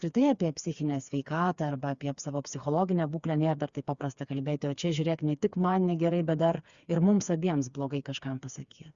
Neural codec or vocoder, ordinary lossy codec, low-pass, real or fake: codec, 16 kHz, 4 kbps, FreqCodec, smaller model; Opus, 64 kbps; 7.2 kHz; fake